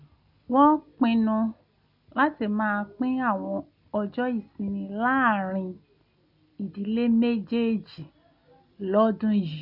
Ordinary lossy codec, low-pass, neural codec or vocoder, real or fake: none; 5.4 kHz; none; real